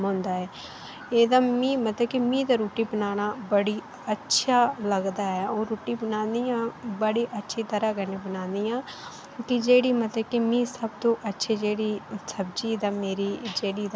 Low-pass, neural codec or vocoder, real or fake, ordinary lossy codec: none; none; real; none